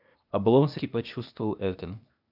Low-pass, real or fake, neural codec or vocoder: 5.4 kHz; fake; codec, 16 kHz, 0.8 kbps, ZipCodec